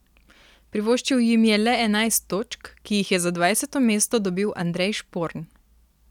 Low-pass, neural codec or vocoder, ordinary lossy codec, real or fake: 19.8 kHz; none; none; real